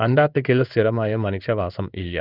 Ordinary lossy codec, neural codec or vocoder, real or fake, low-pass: none; codec, 16 kHz in and 24 kHz out, 1 kbps, XY-Tokenizer; fake; 5.4 kHz